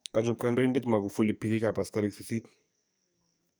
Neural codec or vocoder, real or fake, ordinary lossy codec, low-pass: codec, 44.1 kHz, 2.6 kbps, SNAC; fake; none; none